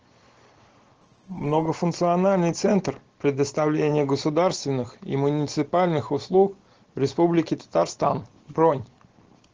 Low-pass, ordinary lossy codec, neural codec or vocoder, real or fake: 7.2 kHz; Opus, 16 kbps; none; real